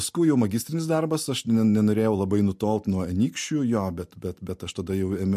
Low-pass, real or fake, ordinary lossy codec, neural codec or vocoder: 14.4 kHz; real; MP3, 64 kbps; none